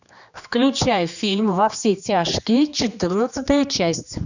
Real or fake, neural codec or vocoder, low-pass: fake; codec, 16 kHz, 2 kbps, X-Codec, HuBERT features, trained on general audio; 7.2 kHz